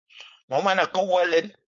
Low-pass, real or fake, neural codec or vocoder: 7.2 kHz; fake; codec, 16 kHz, 4.8 kbps, FACodec